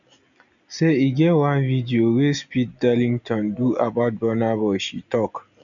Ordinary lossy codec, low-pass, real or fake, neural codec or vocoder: none; 7.2 kHz; real; none